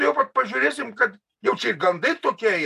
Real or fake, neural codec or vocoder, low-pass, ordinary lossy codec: real; none; 14.4 kHz; AAC, 96 kbps